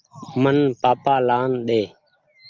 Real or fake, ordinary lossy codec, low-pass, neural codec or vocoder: real; Opus, 24 kbps; 7.2 kHz; none